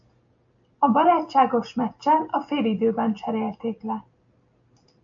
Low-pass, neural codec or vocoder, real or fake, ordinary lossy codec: 7.2 kHz; none; real; AAC, 64 kbps